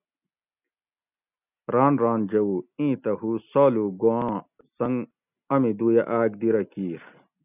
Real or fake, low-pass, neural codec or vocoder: real; 3.6 kHz; none